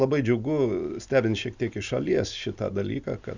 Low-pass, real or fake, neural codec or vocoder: 7.2 kHz; real; none